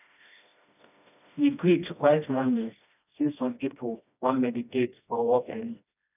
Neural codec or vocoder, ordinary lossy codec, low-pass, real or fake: codec, 16 kHz, 1 kbps, FreqCodec, smaller model; none; 3.6 kHz; fake